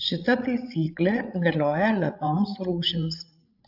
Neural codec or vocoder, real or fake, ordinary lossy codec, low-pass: codec, 16 kHz, 16 kbps, FreqCodec, larger model; fake; AAC, 48 kbps; 5.4 kHz